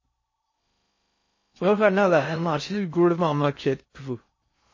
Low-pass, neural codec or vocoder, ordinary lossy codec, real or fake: 7.2 kHz; codec, 16 kHz in and 24 kHz out, 0.6 kbps, FocalCodec, streaming, 4096 codes; MP3, 32 kbps; fake